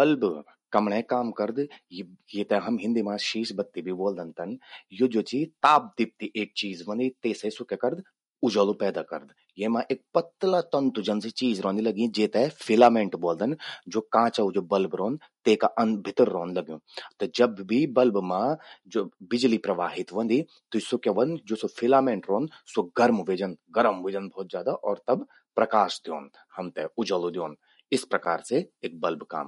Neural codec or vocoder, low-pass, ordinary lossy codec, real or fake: none; 19.8 kHz; MP3, 48 kbps; real